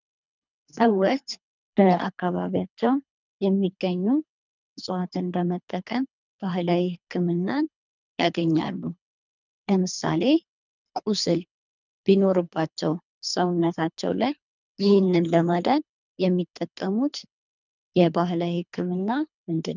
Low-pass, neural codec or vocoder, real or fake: 7.2 kHz; codec, 24 kHz, 3 kbps, HILCodec; fake